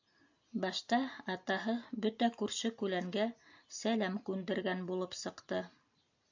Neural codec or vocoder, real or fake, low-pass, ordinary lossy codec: none; real; 7.2 kHz; AAC, 48 kbps